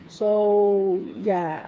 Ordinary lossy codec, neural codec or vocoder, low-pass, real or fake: none; codec, 16 kHz, 4 kbps, FreqCodec, smaller model; none; fake